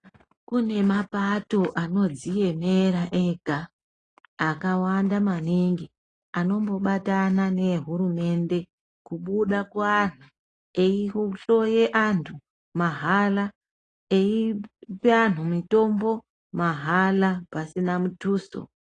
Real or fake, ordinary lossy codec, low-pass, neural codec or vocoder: real; AAC, 32 kbps; 9.9 kHz; none